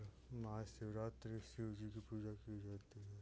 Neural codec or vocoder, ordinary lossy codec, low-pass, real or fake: none; none; none; real